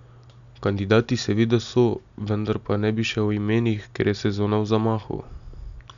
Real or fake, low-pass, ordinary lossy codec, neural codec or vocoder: real; 7.2 kHz; none; none